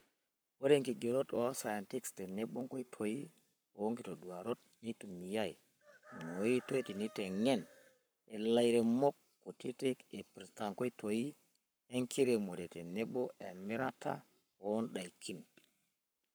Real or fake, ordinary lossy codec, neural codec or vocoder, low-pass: fake; none; codec, 44.1 kHz, 7.8 kbps, Pupu-Codec; none